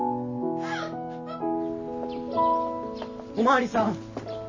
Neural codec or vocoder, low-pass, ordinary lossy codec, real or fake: none; 7.2 kHz; MP3, 32 kbps; real